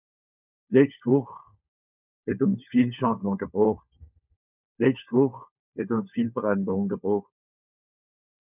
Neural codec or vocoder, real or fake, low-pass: codec, 16 kHz, 4 kbps, FunCodec, trained on LibriTTS, 50 frames a second; fake; 3.6 kHz